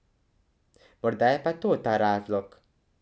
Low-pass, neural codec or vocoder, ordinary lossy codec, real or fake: none; none; none; real